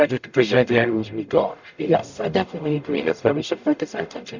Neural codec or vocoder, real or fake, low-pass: codec, 44.1 kHz, 0.9 kbps, DAC; fake; 7.2 kHz